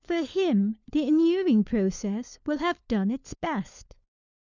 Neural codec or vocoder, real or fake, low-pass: codec, 16 kHz, 4 kbps, FunCodec, trained on LibriTTS, 50 frames a second; fake; 7.2 kHz